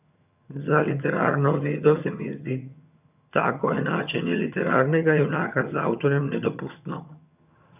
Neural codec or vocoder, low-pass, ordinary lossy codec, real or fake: vocoder, 22.05 kHz, 80 mel bands, HiFi-GAN; 3.6 kHz; none; fake